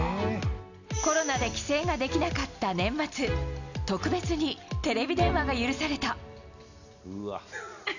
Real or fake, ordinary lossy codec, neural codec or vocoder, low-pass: real; none; none; 7.2 kHz